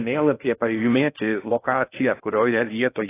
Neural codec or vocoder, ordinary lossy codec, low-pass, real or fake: codec, 16 kHz in and 24 kHz out, 0.6 kbps, FocalCodec, streaming, 2048 codes; AAC, 24 kbps; 3.6 kHz; fake